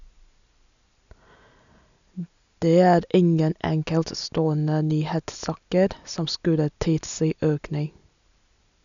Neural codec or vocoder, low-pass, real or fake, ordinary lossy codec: none; 7.2 kHz; real; none